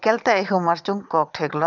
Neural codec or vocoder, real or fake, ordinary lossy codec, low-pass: vocoder, 22.05 kHz, 80 mel bands, Vocos; fake; none; 7.2 kHz